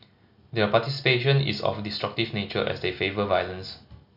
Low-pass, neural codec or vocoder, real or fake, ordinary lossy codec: 5.4 kHz; none; real; none